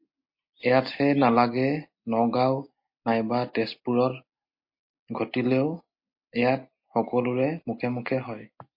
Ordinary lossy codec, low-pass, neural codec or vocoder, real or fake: MP3, 32 kbps; 5.4 kHz; none; real